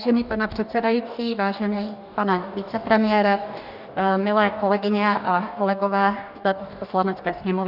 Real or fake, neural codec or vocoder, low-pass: fake; codec, 44.1 kHz, 2.6 kbps, DAC; 5.4 kHz